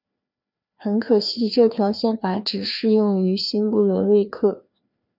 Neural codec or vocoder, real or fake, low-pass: codec, 16 kHz, 2 kbps, FreqCodec, larger model; fake; 5.4 kHz